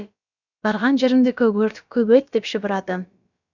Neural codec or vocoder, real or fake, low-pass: codec, 16 kHz, about 1 kbps, DyCAST, with the encoder's durations; fake; 7.2 kHz